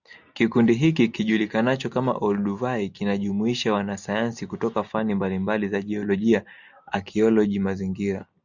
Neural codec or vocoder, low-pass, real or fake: none; 7.2 kHz; real